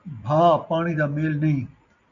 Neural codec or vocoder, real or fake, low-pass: none; real; 7.2 kHz